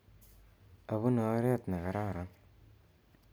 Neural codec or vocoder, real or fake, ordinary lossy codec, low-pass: none; real; none; none